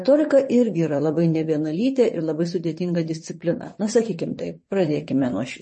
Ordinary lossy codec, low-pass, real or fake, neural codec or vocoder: MP3, 32 kbps; 9.9 kHz; fake; vocoder, 22.05 kHz, 80 mel bands, WaveNeXt